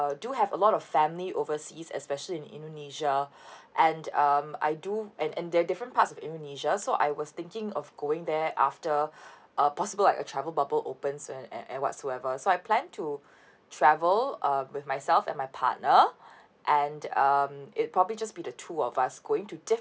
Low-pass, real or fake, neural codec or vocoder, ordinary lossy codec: none; real; none; none